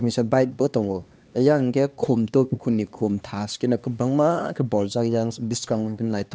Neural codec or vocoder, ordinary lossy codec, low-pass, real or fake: codec, 16 kHz, 2 kbps, X-Codec, HuBERT features, trained on LibriSpeech; none; none; fake